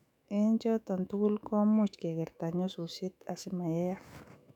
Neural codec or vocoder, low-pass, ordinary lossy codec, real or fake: autoencoder, 48 kHz, 128 numbers a frame, DAC-VAE, trained on Japanese speech; 19.8 kHz; none; fake